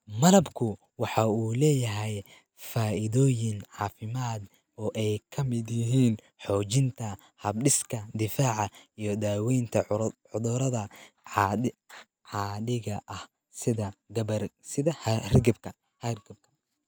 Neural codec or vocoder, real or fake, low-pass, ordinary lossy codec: none; real; none; none